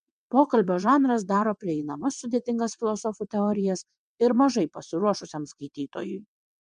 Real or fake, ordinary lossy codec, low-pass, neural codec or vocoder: fake; MP3, 64 kbps; 9.9 kHz; vocoder, 22.05 kHz, 80 mel bands, WaveNeXt